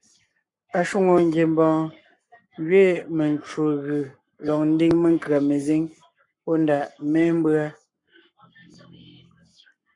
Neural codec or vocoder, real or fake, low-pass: codec, 44.1 kHz, 7.8 kbps, Pupu-Codec; fake; 10.8 kHz